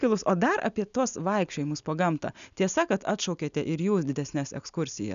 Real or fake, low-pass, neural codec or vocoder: real; 7.2 kHz; none